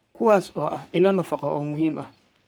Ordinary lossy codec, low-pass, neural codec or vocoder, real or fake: none; none; codec, 44.1 kHz, 3.4 kbps, Pupu-Codec; fake